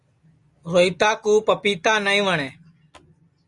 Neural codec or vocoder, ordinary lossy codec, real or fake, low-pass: none; Opus, 64 kbps; real; 10.8 kHz